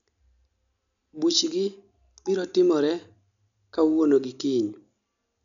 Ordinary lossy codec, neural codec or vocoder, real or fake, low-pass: none; none; real; 7.2 kHz